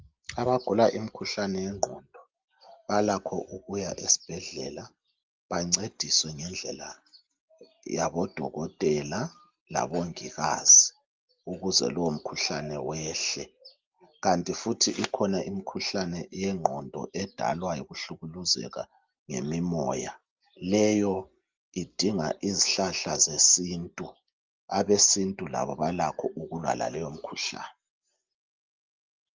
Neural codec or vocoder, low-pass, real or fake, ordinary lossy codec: none; 7.2 kHz; real; Opus, 32 kbps